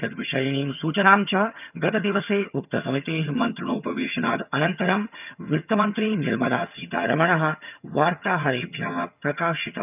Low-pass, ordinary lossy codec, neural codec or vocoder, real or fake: 3.6 kHz; none; vocoder, 22.05 kHz, 80 mel bands, HiFi-GAN; fake